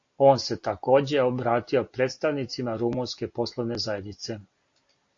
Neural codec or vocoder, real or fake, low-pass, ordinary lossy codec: none; real; 7.2 kHz; AAC, 48 kbps